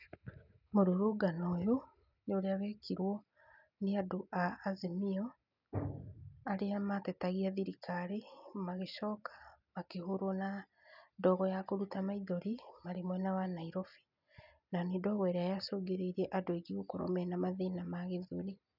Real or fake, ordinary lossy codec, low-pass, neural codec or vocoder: real; none; 5.4 kHz; none